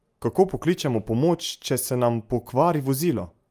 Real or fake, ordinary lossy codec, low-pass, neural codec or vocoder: real; Opus, 32 kbps; 14.4 kHz; none